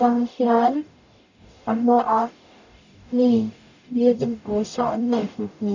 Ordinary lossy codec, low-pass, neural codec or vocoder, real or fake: none; 7.2 kHz; codec, 44.1 kHz, 0.9 kbps, DAC; fake